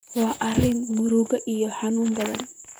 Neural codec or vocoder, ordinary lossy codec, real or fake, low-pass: vocoder, 44.1 kHz, 128 mel bands, Pupu-Vocoder; none; fake; none